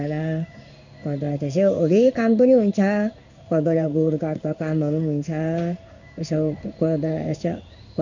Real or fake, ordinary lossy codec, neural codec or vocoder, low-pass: fake; none; codec, 16 kHz in and 24 kHz out, 1 kbps, XY-Tokenizer; 7.2 kHz